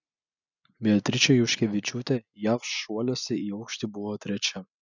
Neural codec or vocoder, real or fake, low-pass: none; real; 7.2 kHz